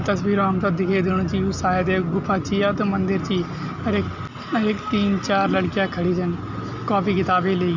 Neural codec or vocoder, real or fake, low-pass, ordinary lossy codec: none; real; 7.2 kHz; none